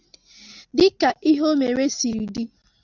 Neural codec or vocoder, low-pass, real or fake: none; 7.2 kHz; real